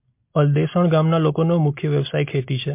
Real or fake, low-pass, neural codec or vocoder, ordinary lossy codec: real; 3.6 kHz; none; MP3, 24 kbps